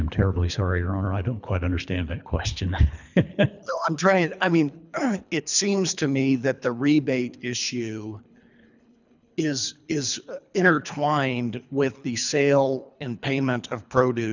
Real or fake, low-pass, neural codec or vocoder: fake; 7.2 kHz; codec, 24 kHz, 3 kbps, HILCodec